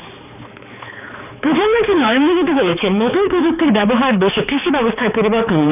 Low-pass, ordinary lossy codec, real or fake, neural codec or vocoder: 3.6 kHz; none; fake; codec, 16 kHz, 4 kbps, X-Codec, HuBERT features, trained on balanced general audio